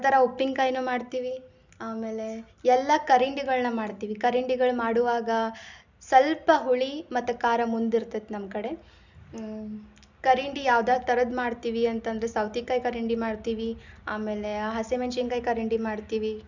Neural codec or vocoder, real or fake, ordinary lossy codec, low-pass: none; real; none; 7.2 kHz